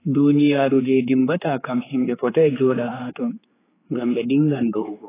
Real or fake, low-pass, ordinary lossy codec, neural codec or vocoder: fake; 3.6 kHz; AAC, 16 kbps; codec, 16 kHz, 4 kbps, X-Codec, HuBERT features, trained on general audio